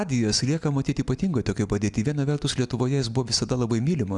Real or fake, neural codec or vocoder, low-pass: real; none; 10.8 kHz